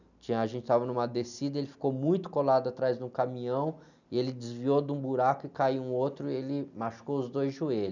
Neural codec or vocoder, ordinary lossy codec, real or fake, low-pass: none; none; real; 7.2 kHz